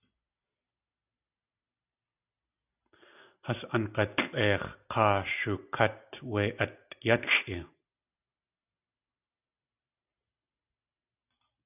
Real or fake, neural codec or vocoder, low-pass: real; none; 3.6 kHz